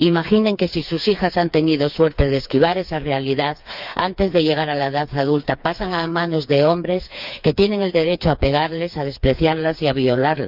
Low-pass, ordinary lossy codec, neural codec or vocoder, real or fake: 5.4 kHz; none; codec, 16 kHz, 4 kbps, FreqCodec, smaller model; fake